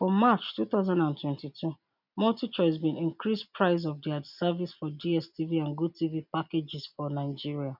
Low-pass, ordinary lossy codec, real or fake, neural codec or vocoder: 5.4 kHz; none; real; none